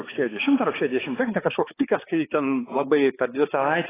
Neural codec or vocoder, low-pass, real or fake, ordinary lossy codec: codec, 16 kHz, 4 kbps, X-Codec, HuBERT features, trained on balanced general audio; 3.6 kHz; fake; AAC, 16 kbps